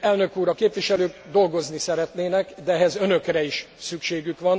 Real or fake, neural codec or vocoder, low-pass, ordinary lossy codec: real; none; none; none